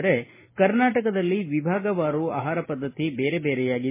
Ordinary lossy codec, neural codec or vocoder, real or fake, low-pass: MP3, 16 kbps; none; real; 3.6 kHz